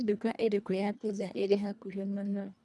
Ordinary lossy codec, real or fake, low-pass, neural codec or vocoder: none; fake; none; codec, 24 kHz, 1.5 kbps, HILCodec